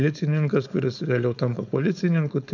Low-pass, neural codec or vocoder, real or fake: 7.2 kHz; codec, 16 kHz, 4.8 kbps, FACodec; fake